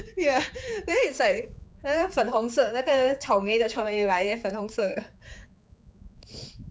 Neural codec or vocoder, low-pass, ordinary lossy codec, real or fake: codec, 16 kHz, 4 kbps, X-Codec, HuBERT features, trained on general audio; none; none; fake